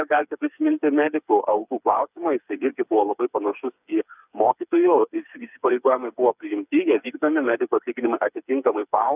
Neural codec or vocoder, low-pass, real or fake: codec, 16 kHz, 4 kbps, FreqCodec, smaller model; 3.6 kHz; fake